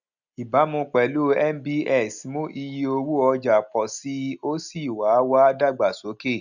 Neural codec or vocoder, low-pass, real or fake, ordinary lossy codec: none; 7.2 kHz; real; none